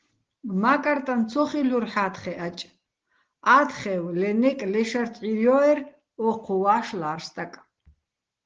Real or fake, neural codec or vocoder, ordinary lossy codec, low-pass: real; none; Opus, 16 kbps; 7.2 kHz